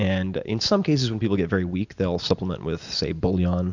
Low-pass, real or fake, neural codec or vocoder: 7.2 kHz; real; none